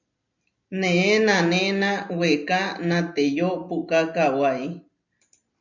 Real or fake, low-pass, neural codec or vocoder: real; 7.2 kHz; none